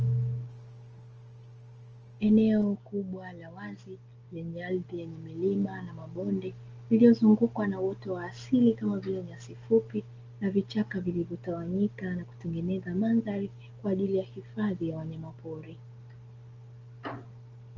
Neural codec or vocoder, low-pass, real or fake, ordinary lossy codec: none; 7.2 kHz; real; Opus, 24 kbps